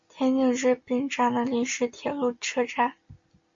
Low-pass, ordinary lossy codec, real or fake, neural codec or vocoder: 7.2 kHz; MP3, 48 kbps; real; none